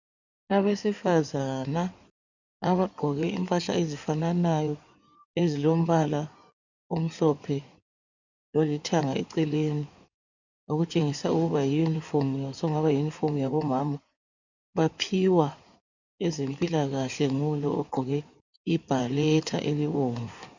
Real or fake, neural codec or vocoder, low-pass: fake; codec, 16 kHz in and 24 kHz out, 2.2 kbps, FireRedTTS-2 codec; 7.2 kHz